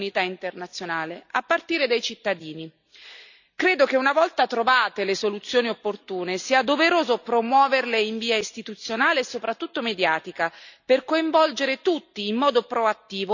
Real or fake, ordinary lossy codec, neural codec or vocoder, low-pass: real; none; none; 7.2 kHz